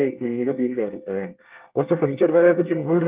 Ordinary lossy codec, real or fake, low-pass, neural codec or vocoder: Opus, 32 kbps; fake; 3.6 kHz; codec, 24 kHz, 1 kbps, SNAC